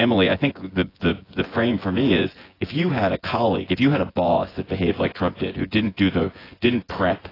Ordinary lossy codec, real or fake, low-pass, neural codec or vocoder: AAC, 24 kbps; fake; 5.4 kHz; vocoder, 24 kHz, 100 mel bands, Vocos